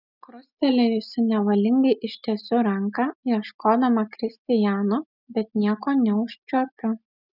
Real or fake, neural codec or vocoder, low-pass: real; none; 5.4 kHz